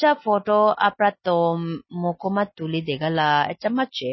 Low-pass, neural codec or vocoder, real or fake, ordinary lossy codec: 7.2 kHz; none; real; MP3, 24 kbps